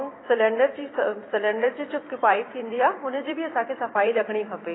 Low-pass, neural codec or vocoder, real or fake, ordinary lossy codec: 7.2 kHz; vocoder, 44.1 kHz, 128 mel bands every 512 samples, BigVGAN v2; fake; AAC, 16 kbps